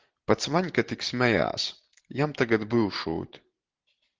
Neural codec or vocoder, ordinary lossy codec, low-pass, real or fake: vocoder, 44.1 kHz, 128 mel bands every 512 samples, BigVGAN v2; Opus, 16 kbps; 7.2 kHz; fake